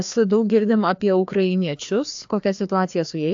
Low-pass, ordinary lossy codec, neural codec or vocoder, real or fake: 7.2 kHz; AAC, 64 kbps; codec, 16 kHz, 2 kbps, FreqCodec, larger model; fake